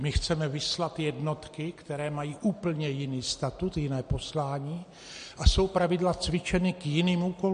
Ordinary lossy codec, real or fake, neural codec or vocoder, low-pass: MP3, 48 kbps; real; none; 9.9 kHz